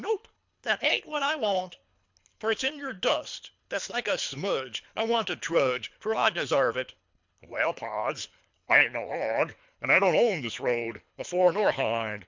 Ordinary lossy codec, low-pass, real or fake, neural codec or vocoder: MP3, 64 kbps; 7.2 kHz; fake; codec, 24 kHz, 3 kbps, HILCodec